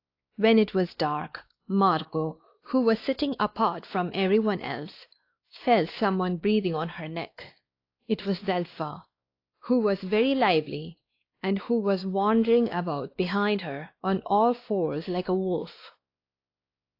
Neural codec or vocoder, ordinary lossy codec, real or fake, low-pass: codec, 16 kHz, 2 kbps, X-Codec, WavLM features, trained on Multilingual LibriSpeech; AAC, 32 kbps; fake; 5.4 kHz